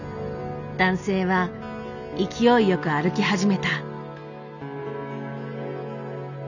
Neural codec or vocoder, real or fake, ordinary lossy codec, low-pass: none; real; none; 7.2 kHz